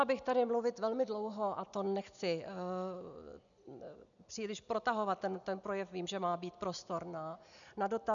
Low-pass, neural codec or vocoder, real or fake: 7.2 kHz; none; real